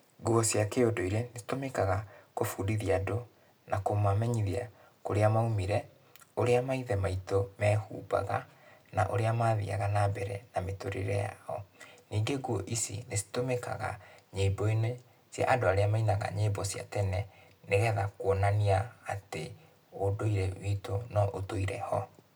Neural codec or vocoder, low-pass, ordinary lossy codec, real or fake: none; none; none; real